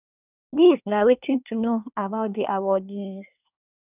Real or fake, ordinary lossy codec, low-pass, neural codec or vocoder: fake; none; 3.6 kHz; codec, 24 kHz, 1 kbps, SNAC